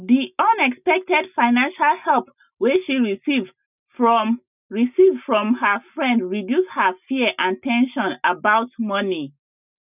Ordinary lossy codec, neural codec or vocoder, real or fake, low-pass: none; none; real; 3.6 kHz